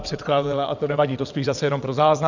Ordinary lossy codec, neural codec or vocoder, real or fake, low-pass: Opus, 64 kbps; vocoder, 22.05 kHz, 80 mel bands, WaveNeXt; fake; 7.2 kHz